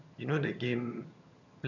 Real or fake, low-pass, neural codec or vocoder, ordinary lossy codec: fake; 7.2 kHz; vocoder, 22.05 kHz, 80 mel bands, HiFi-GAN; none